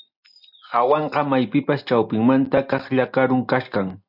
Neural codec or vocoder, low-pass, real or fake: none; 5.4 kHz; real